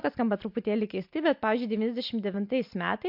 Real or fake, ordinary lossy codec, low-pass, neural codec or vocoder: real; MP3, 48 kbps; 5.4 kHz; none